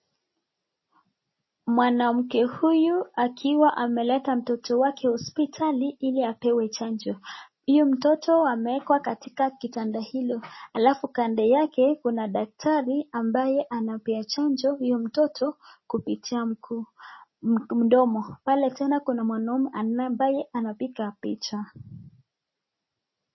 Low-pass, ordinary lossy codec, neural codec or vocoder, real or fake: 7.2 kHz; MP3, 24 kbps; none; real